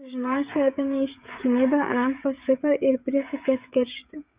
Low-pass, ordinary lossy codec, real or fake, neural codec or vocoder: 3.6 kHz; Opus, 64 kbps; fake; codec, 16 kHz, 16 kbps, FreqCodec, smaller model